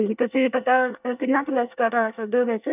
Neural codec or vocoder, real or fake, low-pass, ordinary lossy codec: codec, 24 kHz, 1 kbps, SNAC; fake; 3.6 kHz; none